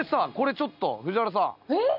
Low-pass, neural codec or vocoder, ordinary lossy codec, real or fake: 5.4 kHz; none; none; real